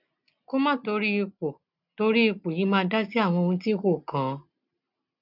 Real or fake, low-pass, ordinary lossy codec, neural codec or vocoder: real; 5.4 kHz; none; none